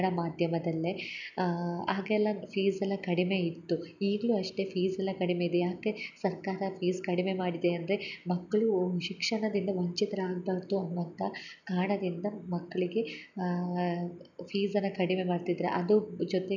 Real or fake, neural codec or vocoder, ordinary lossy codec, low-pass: real; none; none; 7.2 kHz